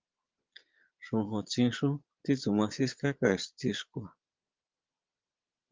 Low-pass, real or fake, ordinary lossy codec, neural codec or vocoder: 7.2 kHz; fake; Opus, 32 kbps; vocoder, 22.05 kHz, 80 mel bands, Vocos